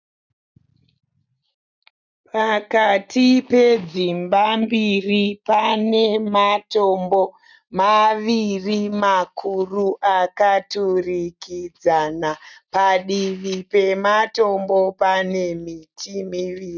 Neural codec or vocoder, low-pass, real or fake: none; 7.2 kHz; real